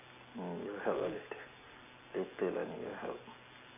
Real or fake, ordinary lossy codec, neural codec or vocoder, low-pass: real; none; none; 3.6 kHz